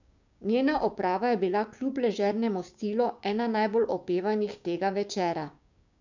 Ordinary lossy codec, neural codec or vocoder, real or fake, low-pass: none; codec, 16 kHz, 6 kbps, DAC; fake; 7.2 kHz